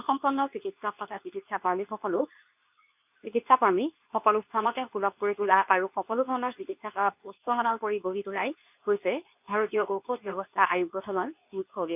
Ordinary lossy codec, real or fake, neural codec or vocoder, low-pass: none; fake; codec, 24 kHz, 0.9 kbps, WavTokenizer, medium speech release version 2; 3.6 kHz